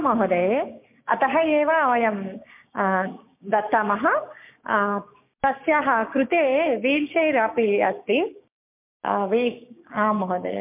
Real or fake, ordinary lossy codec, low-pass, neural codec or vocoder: fake; MP3, 32 kbps; 3.6 kHz; codec, 16 kHz, 6 kbps, DAC